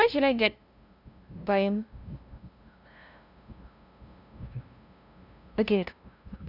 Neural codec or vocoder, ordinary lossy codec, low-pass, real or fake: codec, 16 kHz, 0.5 kbps, FunCodec, trained on LibriTTS, 25 frames a second; none; 5.4 kHz; fake